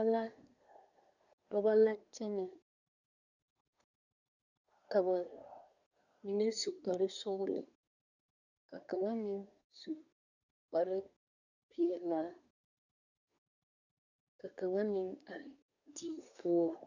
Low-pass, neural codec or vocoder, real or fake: 7.2 kHz; codec, 24 kHz, 1 kbps, SNAC; fake